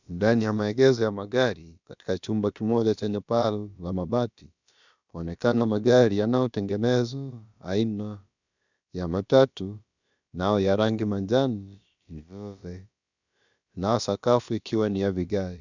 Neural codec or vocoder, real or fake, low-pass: codec, 16 kHz, about 1 kbps, DyCAST, with the encoder's durations; fake; 7.2 kHz